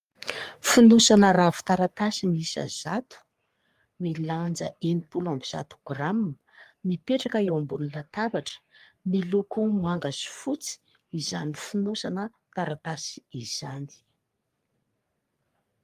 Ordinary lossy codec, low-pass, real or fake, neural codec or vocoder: Opus, 32 kbps; 14.4 kHz; fake; codec, 44.1 kHz, 3.4 kbps, Pupu-Codec